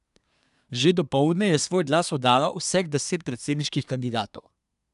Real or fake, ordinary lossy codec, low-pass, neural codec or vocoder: fake; none; 10.8 kHz; codec, 24 kHz, 1 kbps, SNAC